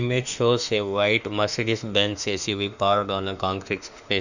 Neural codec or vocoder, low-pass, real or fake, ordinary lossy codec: autoencoder, 48 kHz, 32 numbers a frame, DAC-VAE, trained on Japanese speech; 7.2 kHz; fake; none